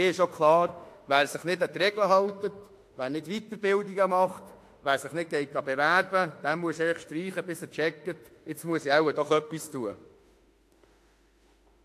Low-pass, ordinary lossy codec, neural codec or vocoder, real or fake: 14.4 kHz; AAC, 64 kbps; autoencoder, 48 kHz, 32 numbers a frame, DAC-VAE, trained on Japanese speech; fake